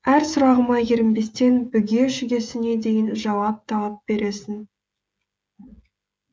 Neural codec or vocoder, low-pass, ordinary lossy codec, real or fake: codec, 16 kHz, 16 kbps, FreqCodec, smaller model; none; none; fake